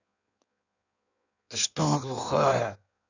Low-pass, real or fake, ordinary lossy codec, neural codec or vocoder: 7.2 kHz; fake; none; codec, 16 kHz in and 24 kHz out, 1.1 kbps, FireRedTTS-2 codec